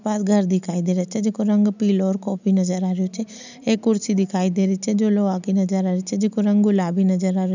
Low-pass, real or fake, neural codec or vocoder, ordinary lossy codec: 7.2 kHz; real; none; none